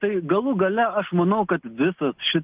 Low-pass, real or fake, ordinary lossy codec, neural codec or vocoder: 3.6 kHz; real; Opus, 32 kbps; none